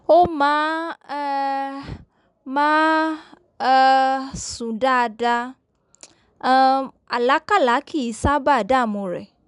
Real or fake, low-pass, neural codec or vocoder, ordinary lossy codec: real; 10.8 kHz; none; none